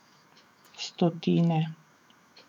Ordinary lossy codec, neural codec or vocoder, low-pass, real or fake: none; autoencoder, 48 kHz, 128 numbers a frame, DAC-VAE, trained on Japanese speech; 19.8 kHz; fake